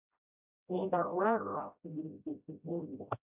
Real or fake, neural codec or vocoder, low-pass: fake; codec, 16 kHz, 0.5 kbps, FreqCodec, smaller model; 3.6 kHz